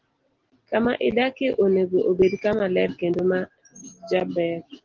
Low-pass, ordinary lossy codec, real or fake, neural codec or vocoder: 7.2 kHz; Opus, 16 kbps; real; none